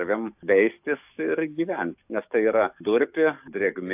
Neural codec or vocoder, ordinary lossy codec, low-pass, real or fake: codec, 44.1 kHz, 7.8 kbps, DAC; AAC, 32 kbps; 3.6 kHz; fake